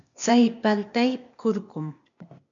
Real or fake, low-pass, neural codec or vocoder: fake; 7.2 kHz; codec, 16 kHz, 0.8 kbps, ZipCodec